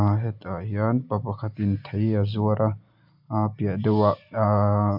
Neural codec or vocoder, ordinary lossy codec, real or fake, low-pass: none; MP3, 48 kbps; real; 5.4 kHz